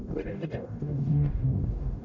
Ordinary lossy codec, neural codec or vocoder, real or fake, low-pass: none; codec, 44.1 kHz, 0.9 kbps, DAC; fake; 7.2 kHz